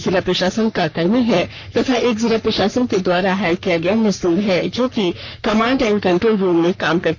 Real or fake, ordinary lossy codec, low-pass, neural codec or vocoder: fake; none; 7.2 kHz; codec, 44.1 kHz, 3.4 kbps, Pupu-Codec